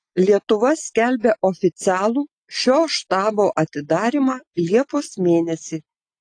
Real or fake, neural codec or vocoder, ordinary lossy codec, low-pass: real; none; AAC, 48 kbps; 9.9 kHz